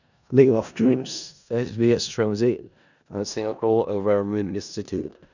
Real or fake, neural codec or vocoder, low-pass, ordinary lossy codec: fake; codec, 16 kHz in and 24 kHz out, 0.4 kbps, LongCat-Audio-Codec, four codebook decoder; 7.2 kHz; none